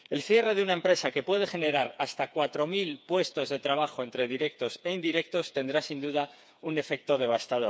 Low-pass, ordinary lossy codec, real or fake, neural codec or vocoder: none; none; fake; codec, 16 kHz, 4 kbps, FreqCodec, smaller model